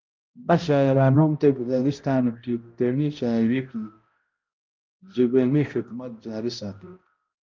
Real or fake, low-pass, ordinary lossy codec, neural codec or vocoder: fake; 7.2 kHz; Opus, 24 kbps; codec, 16 kHz, 0.5 kbps, X-Codec, HuBERT features, trained on balanced general audio